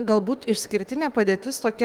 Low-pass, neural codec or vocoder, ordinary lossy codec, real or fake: 19.8 kHz; autoencoder, 48 kHz, 32 numbers a frame, DAC-VAE, trained on Japanese speech; Opus, 24 kbps; fake